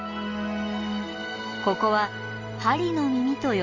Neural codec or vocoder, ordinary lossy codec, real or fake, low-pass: none; Opus, 32 kbps; real; 7.2 kHz